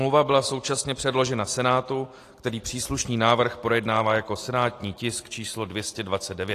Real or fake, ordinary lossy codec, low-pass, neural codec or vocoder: real; AAC, 48 kbps; 14.4 kHz; none